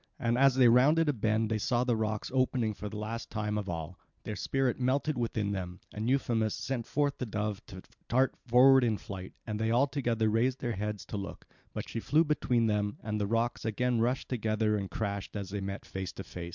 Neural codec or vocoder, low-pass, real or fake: none; 7.2 kHz; real